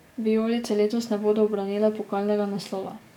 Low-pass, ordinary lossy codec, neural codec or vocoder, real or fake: 19.8 kHz; none; codec, 44.1 kHz, 7.8 kbps, DAC; fake